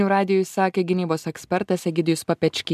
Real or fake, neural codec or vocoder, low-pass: real; none; 14.4 kHz